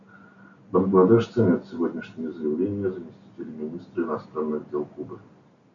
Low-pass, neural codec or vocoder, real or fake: 7.2 kHz; none; real